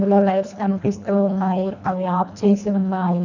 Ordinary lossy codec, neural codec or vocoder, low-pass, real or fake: none; codec, 24 kHz, 1.5 kbps, HILCodec; 7.2 kHz; fake